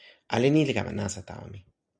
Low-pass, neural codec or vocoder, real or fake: 9.9 kHz; none; real